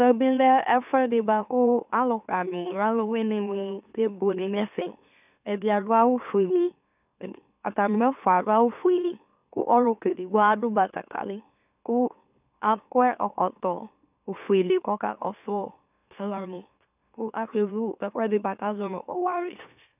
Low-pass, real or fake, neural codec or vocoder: 3.6 kHz; fake; autoencoder, 44.1 kHz, a latent of 192 numbers a frame, MeloTTS